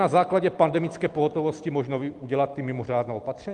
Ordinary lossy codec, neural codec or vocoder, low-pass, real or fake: Opus, 24 kbps; none; 10.8 kHz; real